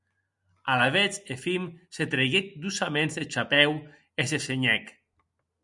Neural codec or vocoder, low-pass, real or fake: none; 10.8 kHz; real